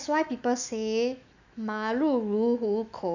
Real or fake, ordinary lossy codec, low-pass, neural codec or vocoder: fake; none; 7.2 kHz; autoencoder, 48 kHz, 128 numbers a frame, DAC-VAE, trained on Japanese speech